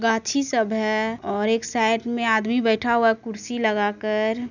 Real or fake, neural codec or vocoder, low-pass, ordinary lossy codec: real; none; 7.2 kHz; none